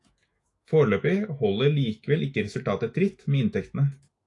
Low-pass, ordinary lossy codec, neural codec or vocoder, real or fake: 10.8 kHz; AAC, 48 kbps; autoencoder, 48 kHz, 128 numbers a frame, DAC-VAE, trained on Japanese speech; fake